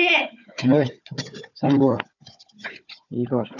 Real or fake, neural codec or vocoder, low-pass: fake; codec, 16 kHz, 4 kbps, FunCodec, trained on Chinese and English, 50 frames a second; 7.2 kHz